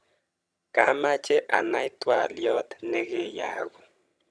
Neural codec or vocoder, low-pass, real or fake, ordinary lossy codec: vocoder, 22.05 kHz, 80 mel bands, HiFi-GAN; none; fake; none